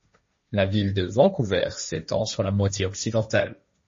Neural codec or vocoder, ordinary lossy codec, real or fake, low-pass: codec, 16 kHz, 1.1 kbps, Voila-Tokenizer; MP3, 32 kbps; fake; 7.2 kHz